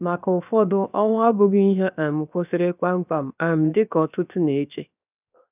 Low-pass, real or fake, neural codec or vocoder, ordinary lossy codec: 3.6 kHz; fake; codec, 16 kHz, 0.7 kbps, FocalCodec; none